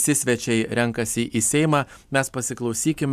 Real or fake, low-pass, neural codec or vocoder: fake; 14.4 kHz; vocoder, 44.1 kHz, 128 mel bands every 256 samples, BigVGAN v2